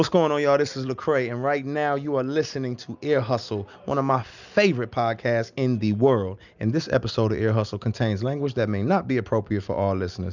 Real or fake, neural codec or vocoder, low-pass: real; none; 7.2 kHz